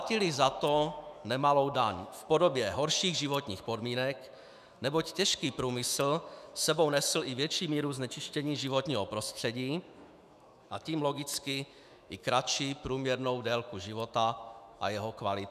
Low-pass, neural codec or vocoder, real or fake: 14.4 kHz; autoencoder, 48 kHz, 128 numbers a frame, DAC-VAE, trained on Japanese speech; fake